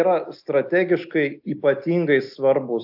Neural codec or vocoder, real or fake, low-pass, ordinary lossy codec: none; real; 5.4 kHz; AAC, 48 kbps